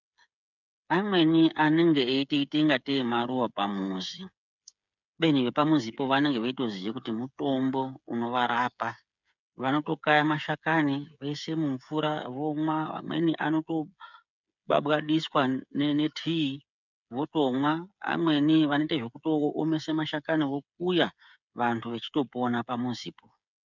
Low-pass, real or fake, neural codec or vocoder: 7.2 kHz; fake; codec, 16 kHz, 8 kbps, FreqCodec, smaller model